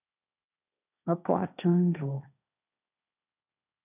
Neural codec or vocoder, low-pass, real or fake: codec, 16 kHz, 1.1 kbps, Voila-Tokenizer; 3.6 kHz; fake